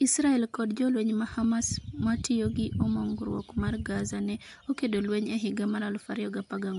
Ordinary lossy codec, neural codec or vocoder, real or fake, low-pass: AAC, 64 kbps; none; real; 10.8 kHz